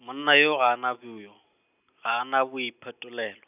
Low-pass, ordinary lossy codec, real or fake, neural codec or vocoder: 3.6 kHz; none; real; none